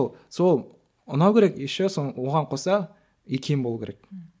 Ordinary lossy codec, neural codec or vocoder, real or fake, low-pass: none; none; real; none